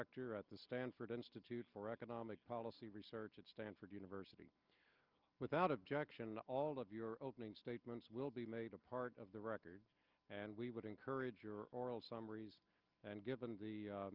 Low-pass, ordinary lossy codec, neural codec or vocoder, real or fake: 5.4 kHz; Opus, 24 kbps; none; real